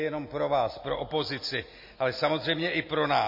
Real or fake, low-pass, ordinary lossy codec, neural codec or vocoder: real; 5.4 kHz; MP3, 24 kbps; none